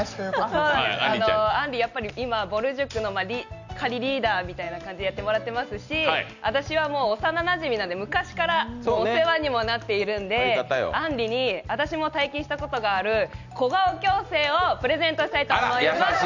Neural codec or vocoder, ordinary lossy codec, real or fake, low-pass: none; none; real; 7.2 kHz